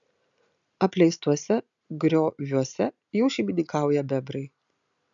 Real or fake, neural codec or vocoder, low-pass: real; none; 7.2 kHz